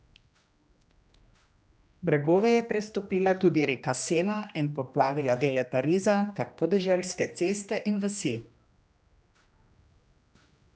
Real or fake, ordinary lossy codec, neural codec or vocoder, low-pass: fake; none; codec, 16 kHz, 1 kbps, X-Codec, HuBERT features, trained on general audio; none